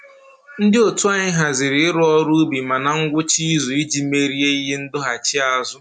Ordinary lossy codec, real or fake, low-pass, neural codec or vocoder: none; real; 9.9 kHz; none